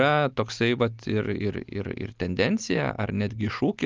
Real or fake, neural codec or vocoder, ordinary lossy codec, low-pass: real; none; Opus, 24 kbps; 7.2 kHz